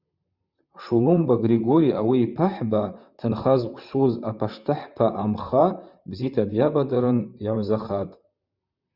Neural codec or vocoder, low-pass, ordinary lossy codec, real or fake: vocoder, 44.1 kHz, 128 mel bands, Pupu-Vocoder; 5.4 kHz; Opus, 64 kbps; fake